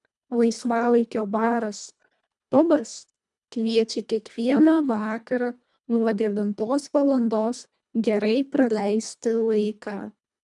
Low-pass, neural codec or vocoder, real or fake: 10.8 kHz; codec, 24 kHz, 1.5 kbps, HILCodec; fake